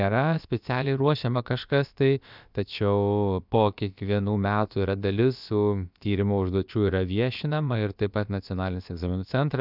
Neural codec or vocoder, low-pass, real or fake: codec, 16 kHz, about 1 kbps, DyCAST, with the encoder's durations; 5.4 kHz; fake